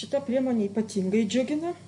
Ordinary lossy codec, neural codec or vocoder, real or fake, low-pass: MP3, 48 kbps; none; real; 10.8 kHz